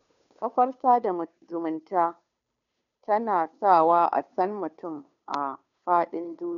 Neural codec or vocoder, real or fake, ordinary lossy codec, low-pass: codec, 16 kHz, 2 kbps, FunCodec, trained on Chinese and English, 25 frames a second; fake; none; 7.2 kHz